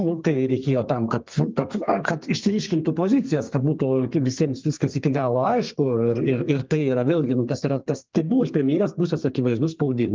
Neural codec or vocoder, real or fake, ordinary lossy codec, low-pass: codec, 32 kHz, 1.9 kbps, SNAC; fake; Opus, 32 kbps; 7.2 kHz